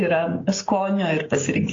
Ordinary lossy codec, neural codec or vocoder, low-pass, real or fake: AAC, 32 kbps; codec, 16 kHz, 6 kbps, DAC; 7.2 kHz; fake